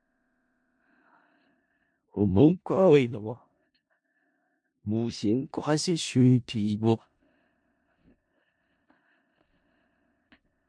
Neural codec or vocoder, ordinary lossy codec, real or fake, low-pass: codec, 16 kHz in and 24 kHz out, 0.4 kbps, LongCat-Audio-Codec, four codebook decoder; MP3, 64 kbps; fake; 9.9 kHz